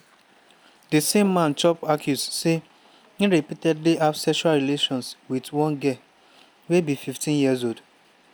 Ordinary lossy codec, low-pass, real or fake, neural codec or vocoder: none; none; real; none